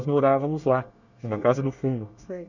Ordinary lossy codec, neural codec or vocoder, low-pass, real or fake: none; codec, 24 kHz, 1 kbps, SNAC; 7.2 kHz; fake